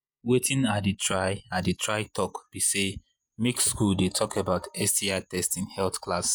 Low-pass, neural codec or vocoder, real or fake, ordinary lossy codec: none; vocoder, 48 kHz, 128 mel bands, Vocos; fake; none